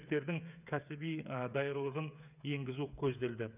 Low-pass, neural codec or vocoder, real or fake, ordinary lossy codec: 3.6 kHz; codec, 16 kHz, 8 kbps, FreqCodec, smaller model; fake; none